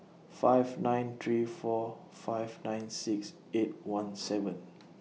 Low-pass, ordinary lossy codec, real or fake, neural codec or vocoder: none; none; real; none